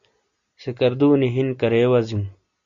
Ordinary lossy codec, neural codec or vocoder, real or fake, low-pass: Opus, 64 kbps; none; real; 7.2 kHz